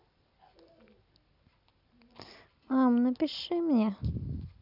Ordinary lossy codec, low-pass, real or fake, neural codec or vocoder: none; 5.4 kHz; real; none